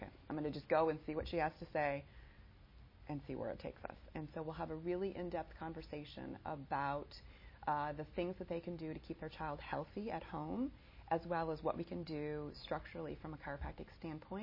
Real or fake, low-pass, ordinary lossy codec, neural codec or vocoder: real; 5.4 kHz; MP3, 24 kbps; none